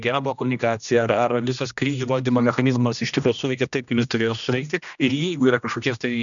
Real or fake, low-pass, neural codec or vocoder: fake; 7.2 kHz; codec, 16 kHz, 1 kbps, X-Codec, HuBERT features, trained on general audio